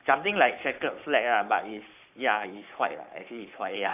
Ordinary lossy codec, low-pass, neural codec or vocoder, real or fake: AAC, 32 kbps; 3.6 kHz; codec, 44.1 kHz, 7.8 kbps, Pupu-Codec; fake